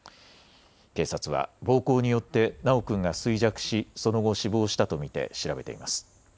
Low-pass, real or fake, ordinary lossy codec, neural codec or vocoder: none; real; none; none